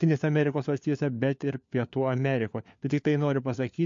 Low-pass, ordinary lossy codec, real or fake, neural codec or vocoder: 7.2 kHz; MP3, 48 kbps; fake; codec, 16 kHz, 4 kbps, FunCodec, trained on LibriTTS, 50 frames a second